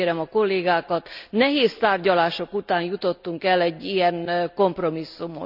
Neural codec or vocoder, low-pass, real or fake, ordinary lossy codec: none; 5.4 kHz; real; none